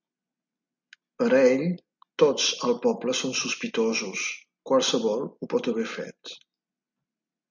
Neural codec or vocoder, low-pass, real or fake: none; 7.2 kHz; real